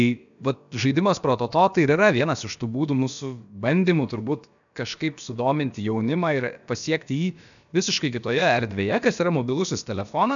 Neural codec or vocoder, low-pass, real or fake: codec, 16 kHz, about 1 kbps, DyCAST, with the encoder's durations; 7.2 kHz; fake